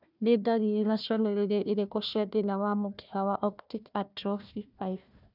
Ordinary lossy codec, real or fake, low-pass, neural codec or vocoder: none; fake; 5.4 kHz; codec, 16 kHz, 1 kbps, FunCodec, trained on Chinese and English, 50 frames a second